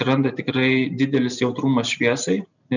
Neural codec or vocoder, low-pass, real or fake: none; 7.2 kHz; real